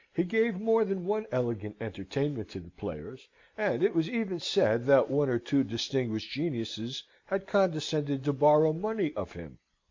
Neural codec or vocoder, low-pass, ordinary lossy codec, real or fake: none; 7.2 kHz; MP3, 64 kbps; real